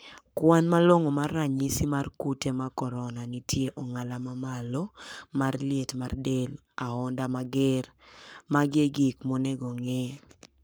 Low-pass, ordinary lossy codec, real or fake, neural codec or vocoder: none; none; fake; codec, 44.1 kHz, 7.8 kbps, Pupu-Codec